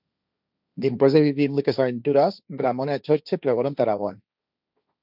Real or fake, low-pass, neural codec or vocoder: fake; 5.4 kHz; codec, 16 kHz, 1.1 kbps, Voila-Tokenizer